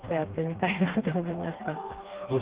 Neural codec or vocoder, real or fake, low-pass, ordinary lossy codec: codec, 16 kHz, 2 kbps, FreqCodec, smaller model; fake; 3.6 kHz; Opus, 16 kbps